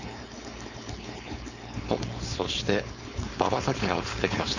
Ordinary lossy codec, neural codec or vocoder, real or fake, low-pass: none; codec, 16 kHz, 4.8 kbps, FACodec; fake; 7.2 kHz